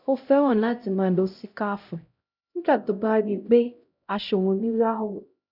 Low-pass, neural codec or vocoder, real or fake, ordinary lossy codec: 5.4 kHz; codec, 16 kHz, 0.5 kbps, X-Codec, HuBERT features, trained on LibriSpeech; fake; none